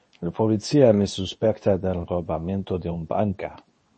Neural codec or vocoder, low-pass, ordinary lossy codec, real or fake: codec, 24 kHz, 0.9 kbps, WavTokenizer, medium speech release version 2; 10.8 kHz; MP3, 32 kbps; fake